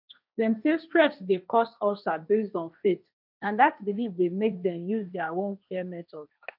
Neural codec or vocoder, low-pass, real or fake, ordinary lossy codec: codec, 16 kHz, 1.1 kbps, Voila-Tokenizer; 5.4 kHz; fake; none